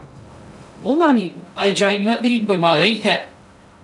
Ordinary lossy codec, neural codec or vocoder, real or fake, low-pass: MP3, 96 kbps; codec, 16 kHz in and 24 kHz out, 0.6 kbps, FocalCodec, streaming, 2048 codes; fake; 10.8 kHz